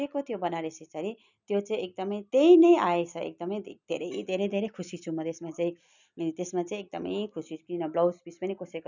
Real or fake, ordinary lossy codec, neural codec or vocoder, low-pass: real; none; none; 7.2 kHz